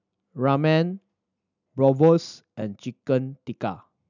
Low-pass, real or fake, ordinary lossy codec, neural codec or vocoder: 7.2 kHz; real; none; none